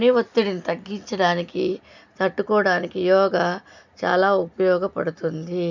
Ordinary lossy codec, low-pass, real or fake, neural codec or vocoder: none; 7.2 kHz; real; none